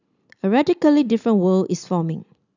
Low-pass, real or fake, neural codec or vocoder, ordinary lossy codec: 7.2 kHz; real; none; none